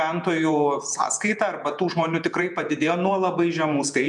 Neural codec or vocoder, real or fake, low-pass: vocoder, 48 kHz, 128 mel bands, Vocos; fake; 10.8 kHz